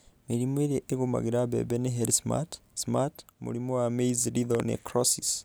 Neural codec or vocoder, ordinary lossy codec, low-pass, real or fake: none; none; none; real